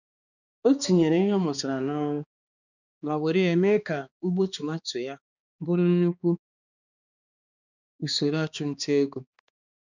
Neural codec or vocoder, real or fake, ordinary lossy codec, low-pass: codec, 16 kHz, 2 kbps, X-Codec, HuBERT features, trained on balanced general audio; fake; none; 7.2 kHz